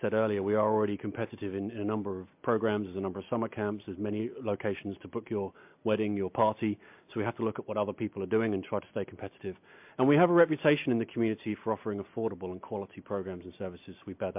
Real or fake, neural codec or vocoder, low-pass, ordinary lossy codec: real; none; 3.6 kHz; MP3, 32 kbps